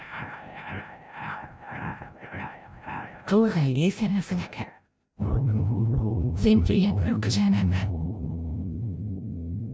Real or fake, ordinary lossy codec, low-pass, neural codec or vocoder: fake; none; none; codec, 16 kHz, 0.5 kbps, FreqCodec, larger model